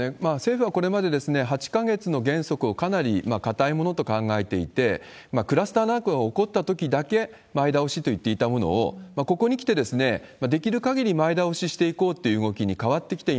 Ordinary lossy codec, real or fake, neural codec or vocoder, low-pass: none; real; none; none